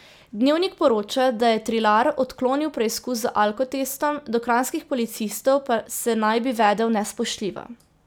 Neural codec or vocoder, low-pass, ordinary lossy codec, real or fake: none; none; none; real